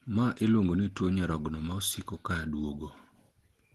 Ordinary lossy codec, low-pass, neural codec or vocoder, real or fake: Opus, 16 kbps; 14.4 kHz; none; real